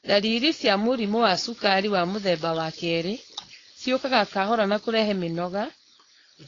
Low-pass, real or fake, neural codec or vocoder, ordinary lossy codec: 7.2 kHz; fake; codec, 16 kHz, 4.8 kbps, FACodec; AAC, 32 kbps